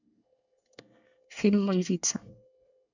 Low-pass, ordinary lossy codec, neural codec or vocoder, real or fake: 7.2 kHz; none; codec, 24 kHz, 1 kbps, SNAC; fake